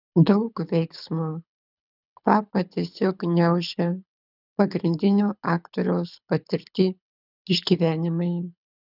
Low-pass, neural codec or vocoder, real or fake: 5.4 kHz; codec, 24 kHz, 6 kbps, HILCodec; fake